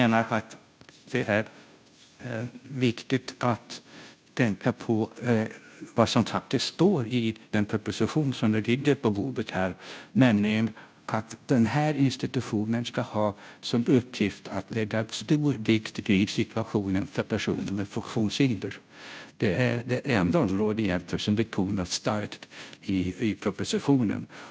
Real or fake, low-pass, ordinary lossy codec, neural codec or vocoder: fake; none; none; codec, 16 kHz, 0.5 kbps, FunCodec, trained on Chinese and English, 25 frames a second